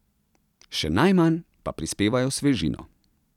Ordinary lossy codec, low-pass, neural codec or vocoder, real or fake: none; 19.8 kHz; vocoder, 44.1 kHz, 128 mel bands every 256 samples, BigVGAN v2; fake